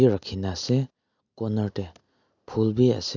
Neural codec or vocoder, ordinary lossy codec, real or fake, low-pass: none; none; real; 7.2 kHz